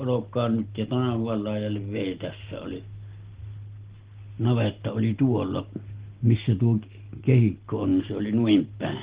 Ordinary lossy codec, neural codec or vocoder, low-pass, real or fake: Opus, 16 kbps; none; 3.6 kHz; real